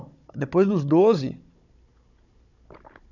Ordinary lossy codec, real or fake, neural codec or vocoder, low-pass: none; fake; codec, 16 kHz, 16 kbps, FunCodec, trained on Chinese and English, 50 frames a second; 7.2 kHz